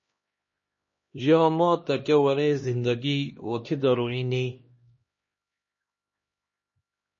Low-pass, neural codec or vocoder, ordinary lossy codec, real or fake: 7.2 kHz; codec, 16 kHz, 1 kbps, X-Codec, HuBERT features, trained on LibriSpeech; MP3, 32 kbps; fake